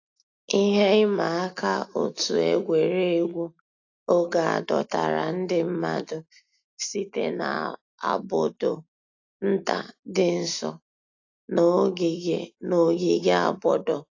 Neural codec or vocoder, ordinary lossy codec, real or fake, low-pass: none; AAC, 48 kbps; real; 7.2 kHz